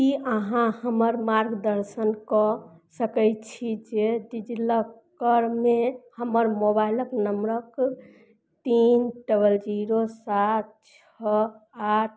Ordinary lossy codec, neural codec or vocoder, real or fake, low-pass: none; none; real; none